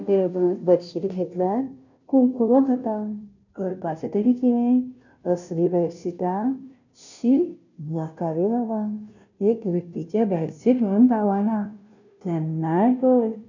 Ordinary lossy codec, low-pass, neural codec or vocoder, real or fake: none; 7.2 kHz; codec, 16 kHz, 0.5 kbps, FunCodec, trained on Chinese and English, 25 frames a second; fake